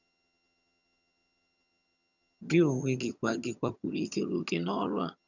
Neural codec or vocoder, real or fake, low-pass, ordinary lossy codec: vocoder, 22.05 kHz, 80 mel bands, HiFi-GAN; fake; 7.2 kHz; none